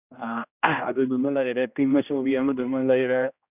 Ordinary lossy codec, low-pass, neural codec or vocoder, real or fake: none; 3.6 kHz; codec, 16 kHz, 1 kbps, X-Codec, HuBERT features, trained on general audio; fake